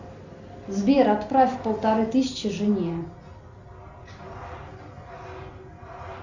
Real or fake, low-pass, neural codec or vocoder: real; 7.2 kHz; none